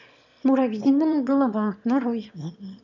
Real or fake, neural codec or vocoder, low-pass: fake; autoencoder, 22.05 kHz, a latent of 192 numbers a frame, VITS, trained on one speaker; 7.2 kHz